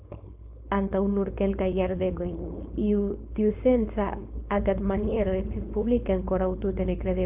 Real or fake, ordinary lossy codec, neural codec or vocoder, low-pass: fake; AAC, 32 kbps; codec, 16 kHz, 4.8 kbps, FACodec; 3.6 kHz